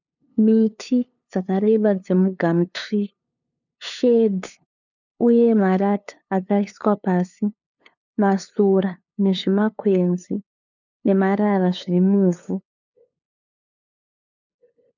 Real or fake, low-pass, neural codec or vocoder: fake; 7.2 kHz; codec, 16 kHz, 2 kbps, FunCodec, trained on LibriTTS, 25 frames a second